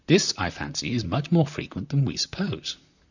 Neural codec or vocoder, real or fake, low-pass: vocoder, 44.1 kHz, 128 mel bands, Pupu-Vocoder; fake; 7.2 kHz